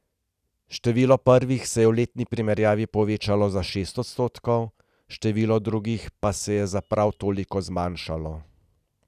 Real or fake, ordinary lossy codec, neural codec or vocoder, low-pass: real; none; none; 14.4 kHz